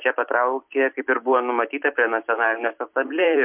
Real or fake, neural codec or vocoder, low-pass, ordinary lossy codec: fake; vocoder, 44.1 kHz, 128 mel bands every 256 samples, BigVGAN v2; 3.6 kHz; MP3, 32 kbps